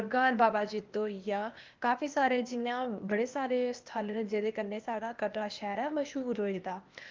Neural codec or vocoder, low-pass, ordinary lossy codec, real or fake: codec, 16 kHz, 0.8 kbps, ZipCodec; 7.2 kHz; Opus, 24 kbps; fake